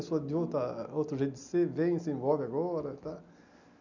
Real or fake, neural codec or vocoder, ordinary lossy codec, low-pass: real; none; none; 7.2 kHz